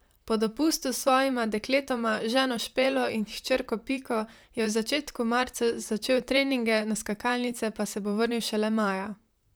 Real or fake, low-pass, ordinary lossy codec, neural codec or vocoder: fake; none; none; vocoder, 44.1 kHz, 128 mel bands, Pupu-Vocoder